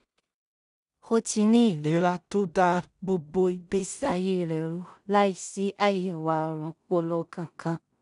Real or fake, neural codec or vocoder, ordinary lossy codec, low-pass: fake; codec, 16 kHz in and 24 kHz out, 0.4 kbps, LongCat-Audio-Codec, two codebook decoder; none; 10.8 kHz